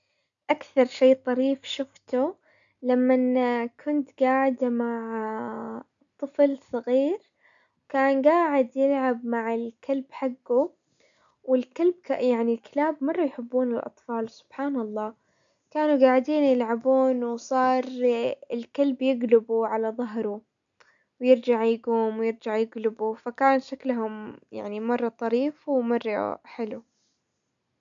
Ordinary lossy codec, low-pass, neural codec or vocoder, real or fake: none; 7.2 kHz; none; real